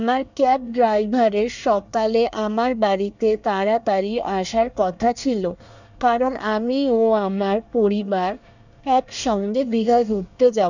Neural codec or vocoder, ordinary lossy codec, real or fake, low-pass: codec, 24 kHz, 1 kbps, SNAC; none; fake; 7.2 kHz